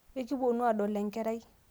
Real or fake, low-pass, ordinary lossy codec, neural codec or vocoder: real; none; none; none